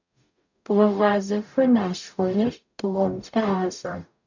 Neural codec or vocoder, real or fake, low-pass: codec, 44.1 kHz, 0.9 kbps, DAC; fake; 7.2 kHz